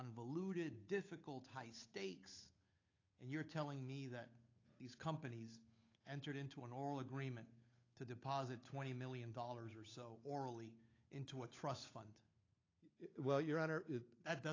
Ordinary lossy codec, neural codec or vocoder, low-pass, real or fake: AAC, 32 kbps; codec, 16 kHz, 8 kbps, FunCodec, trained on Chinese and English, 25 frames a second; 7.2 kHz; fake